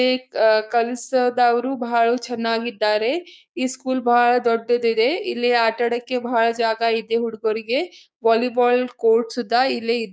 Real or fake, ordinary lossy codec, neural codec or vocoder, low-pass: fake; none; codec, 16 kHz, 6 kbps, DAC; none